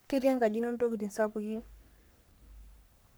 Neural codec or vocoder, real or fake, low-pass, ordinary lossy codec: codec, 44.1 kHz, 3.4 kbps, Pupu-Codec; fake; none; none